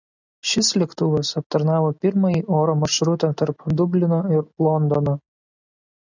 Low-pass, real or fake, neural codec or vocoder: 7.2 kHz; real; none